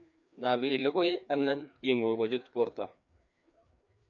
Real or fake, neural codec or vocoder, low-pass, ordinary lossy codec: fake; codec, 16 kHz, 2 kbps, FreqCodec, larger model; 7.2 kHz; none